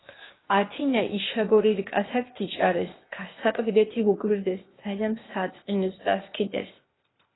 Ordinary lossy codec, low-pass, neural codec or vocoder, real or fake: AAC, 16 kbps; 7.2 kHz; codec, 16 kHz, 0.8 kbps, ZipCodec; fake